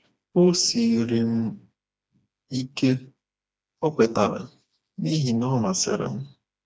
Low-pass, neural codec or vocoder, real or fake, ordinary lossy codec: none; codec, 16 kHz, 2 kbps, FreqCodec, smaller model; fake; none